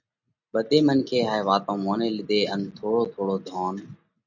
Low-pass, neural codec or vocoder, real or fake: 7.2 kHz; none; real